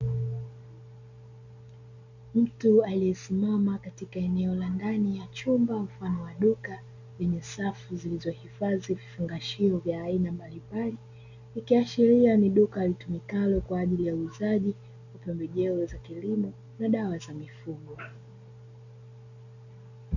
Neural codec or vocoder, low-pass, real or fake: none; 7.2 kHz; real